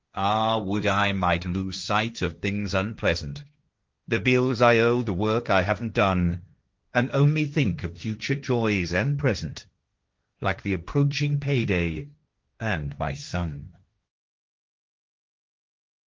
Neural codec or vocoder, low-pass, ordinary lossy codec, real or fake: codec, 16 kHz, 1.1 kbps, Voila-Tokenizer; 7.2 kHz; Opus, 32 kbps; fake